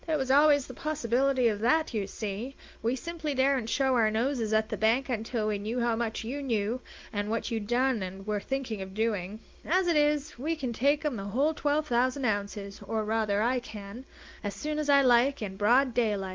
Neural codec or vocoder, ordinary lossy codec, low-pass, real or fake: none; Opus, 32 kbps; 7.2 kHz; real